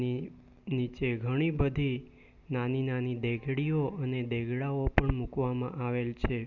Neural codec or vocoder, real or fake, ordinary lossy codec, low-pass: none; real; none; 7.2 kHz